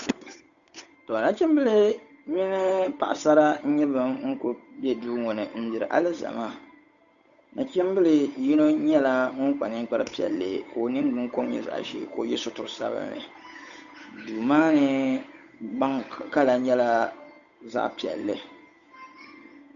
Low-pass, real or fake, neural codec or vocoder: 7.2 kHz; fake; codec, 16 kHz, 8 kbps, FunCodec, trained on Chinese and English, 25 frames a second